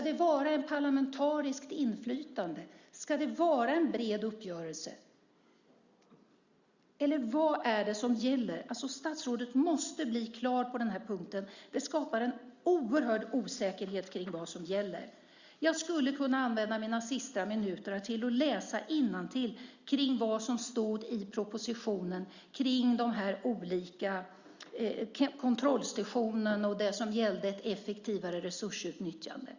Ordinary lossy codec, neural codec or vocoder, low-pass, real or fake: Opus, 64 kbps; none; 7.2 kHz; real